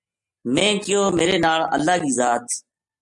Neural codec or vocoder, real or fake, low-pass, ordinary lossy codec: none; real; 10.8 kHz; MP3, 64 kbps